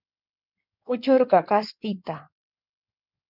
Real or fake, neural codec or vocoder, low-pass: fake; codec, 16 kHz in and 24 kHz out, 2.2 kbps, FireRedTTS-2 codec; 5.4 kHz